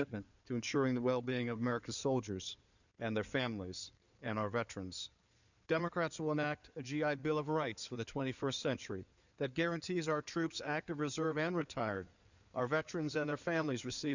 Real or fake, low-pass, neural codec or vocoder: fake; 7.2 kHz; codec, 16 kHz in and 24 kHz out, 2.2 kbps, FireRedTTS-2 codec